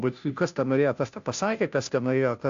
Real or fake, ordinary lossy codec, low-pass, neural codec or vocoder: fake; AAC, 64 kbps; 7.2 kHz; codec, 16 kHz, 0.5 kbps, FunCodec, trained on Chinese and English, 25 frames a second